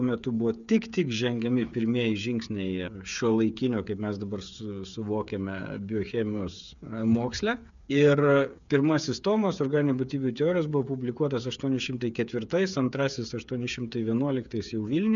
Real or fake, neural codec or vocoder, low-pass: fake; codec, 16 kHz, 8 kbps, FreqCodec, smaller model; 7.2 kHz